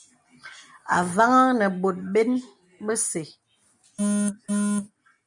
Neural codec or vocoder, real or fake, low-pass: none; real; 10.8 kHz